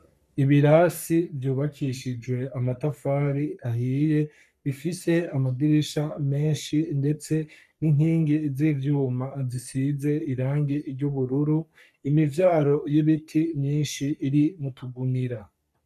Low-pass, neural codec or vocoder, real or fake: 14.4 kHz; codec, 44.1 kHz, 3.4 kbps, Pupu-Codec; fake